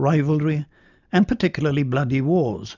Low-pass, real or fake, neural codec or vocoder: 7.2 kHz; real; none